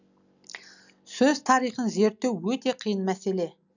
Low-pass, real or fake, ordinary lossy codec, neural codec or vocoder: 7.2 kHz; real; none; none